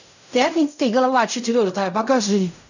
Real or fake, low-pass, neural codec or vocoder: fake; 7.2 kHz; codec, 16 kHz in and 24 kHz out, 0.4 kbps, LongCat-Audio-Codec, fine tuned four codebook decoder